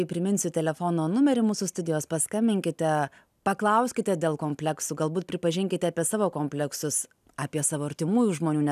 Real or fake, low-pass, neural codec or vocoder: real; 14.4 kHz; none